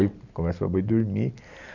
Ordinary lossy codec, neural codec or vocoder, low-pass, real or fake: none; none; 7.2 kHz; real